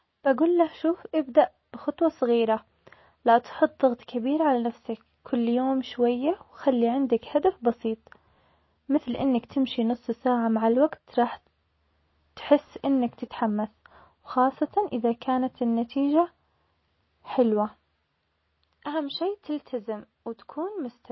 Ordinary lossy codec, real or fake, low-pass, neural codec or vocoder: MP3, 24 kbps; real; 7.2 kHz; none